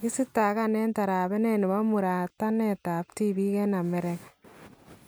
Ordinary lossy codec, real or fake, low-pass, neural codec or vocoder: none; real; none; none